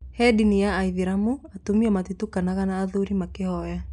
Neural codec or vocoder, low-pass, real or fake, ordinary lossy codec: none; 10.8 kHz; real; none